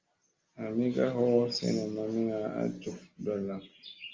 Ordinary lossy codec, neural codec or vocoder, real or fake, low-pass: Opus, 32 kbps; none; real; 7.2 kHz